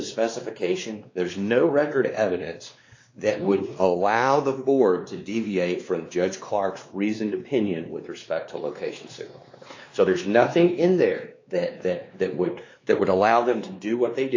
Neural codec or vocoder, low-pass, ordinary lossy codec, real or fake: codec, 16 kHz, 2 kbps, X-Codec, WavLM features, trained on Multilingual LibriSpeech; 7.2 kHz; AAC, 48 kbps; fake